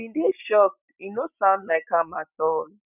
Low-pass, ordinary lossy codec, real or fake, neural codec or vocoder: 3.6 kHz; none; real; none